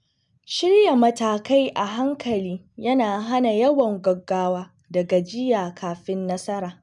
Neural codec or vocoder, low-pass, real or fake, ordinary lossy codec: none; 10.8 kHz; real; none